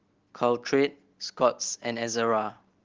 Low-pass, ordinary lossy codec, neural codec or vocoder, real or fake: 7.2 kHz; Opus, 16 kbps; none; real